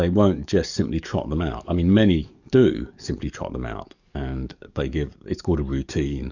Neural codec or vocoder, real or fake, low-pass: codec, 44.1 kHz, 7.8 kbps, DAC; fake; 7.2 kHz